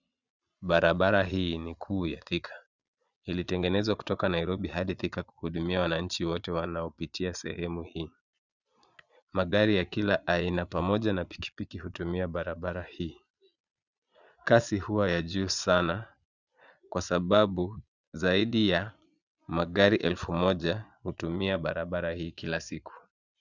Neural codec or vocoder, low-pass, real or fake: vocoder, 22.05 kHz, 80 mel bands, Vocos; 7.2 kHz; fake